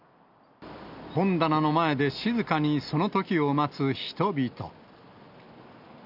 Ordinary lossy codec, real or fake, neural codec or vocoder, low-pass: none; real; none; 5.4 kHz